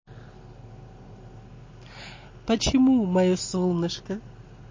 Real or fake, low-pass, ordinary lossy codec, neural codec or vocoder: real; 7.2 kHz; MP3, 32 kbps; none